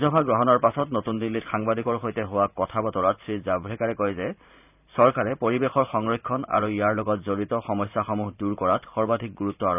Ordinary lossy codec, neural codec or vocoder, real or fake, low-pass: none; vocoder, 44.1 kHz, 128 mel bands every 512 samples, BigVGAN v2; fake; 3.6 kHz